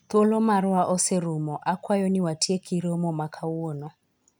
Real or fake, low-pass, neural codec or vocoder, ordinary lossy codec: real; none; none; none